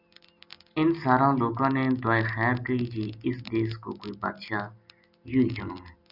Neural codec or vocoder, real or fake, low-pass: none; real; 5.4 kHz